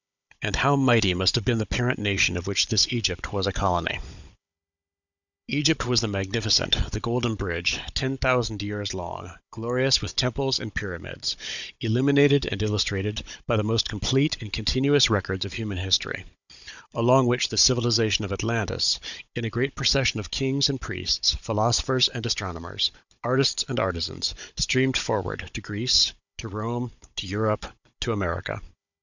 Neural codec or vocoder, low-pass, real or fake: codec, 16 kHz, 16 kbps, FunCodec, trained on Chinese and English, 50 frames a second; 7.2 kHz; fake